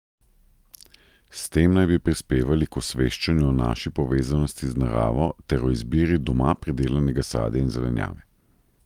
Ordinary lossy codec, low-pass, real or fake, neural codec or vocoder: Opus, 32 kbps; 19.8 kHz; real; none